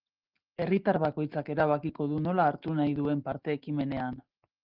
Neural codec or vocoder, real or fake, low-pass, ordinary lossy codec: none; real; 5.4 kHz; Opus, 16 kbps